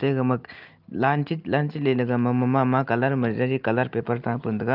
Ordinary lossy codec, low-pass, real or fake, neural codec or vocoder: Opus, 32 kbps; 5.4 kHz; real; none